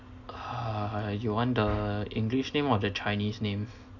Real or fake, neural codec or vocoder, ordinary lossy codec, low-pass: real; none; none; 7.2 kHz